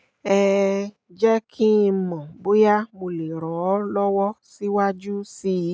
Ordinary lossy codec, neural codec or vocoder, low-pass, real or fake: none; none; none; real